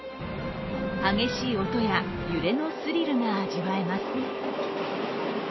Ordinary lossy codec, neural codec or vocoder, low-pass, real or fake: MP3, 24 kbps; none; 7.2 kHz; real